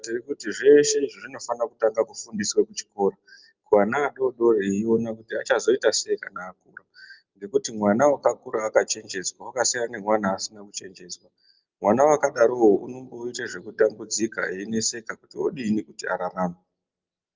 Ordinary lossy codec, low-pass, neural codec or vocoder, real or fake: Opus, 24 kbps; 7.2 kHz; none; real